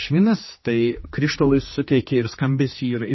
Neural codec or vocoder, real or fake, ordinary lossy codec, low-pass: codec, 16 kHz, 2 kbps, X-Codec, HuBERT features, trained on general audio; fake; MP3, 24 kbps; 7.2 kHz